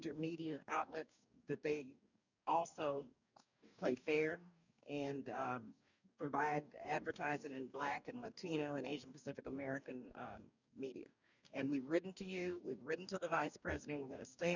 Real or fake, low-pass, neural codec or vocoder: fake; 7.2 kHz; codec, 44.1 kHz, 2.6 kbps, DAC